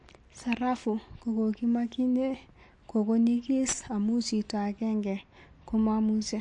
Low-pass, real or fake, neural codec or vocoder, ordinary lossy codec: 10.8 kHz; real; none; MP3, 48 kbps